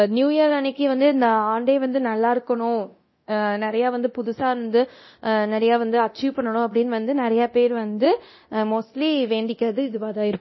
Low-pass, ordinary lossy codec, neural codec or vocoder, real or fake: 7.2 kHz; MP3, 24 kbps; codec, 24 kHz, 0.9 kbps, DualCodec; fake